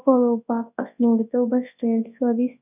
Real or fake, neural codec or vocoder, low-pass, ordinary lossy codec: fake; codec, 24 kHz, 0.9 kbps, WavTokenizer, large speech release; 3.6 kHz; none